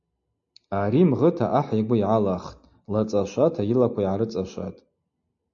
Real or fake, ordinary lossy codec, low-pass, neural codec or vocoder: real; MP3, 48 kbps; 7.2 kHz; none